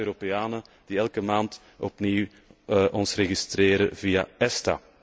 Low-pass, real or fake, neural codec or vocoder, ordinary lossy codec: none; real; none; none